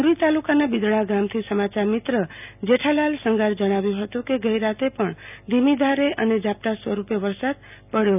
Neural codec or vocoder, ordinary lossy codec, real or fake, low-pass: none; none; real; 3.6 kHz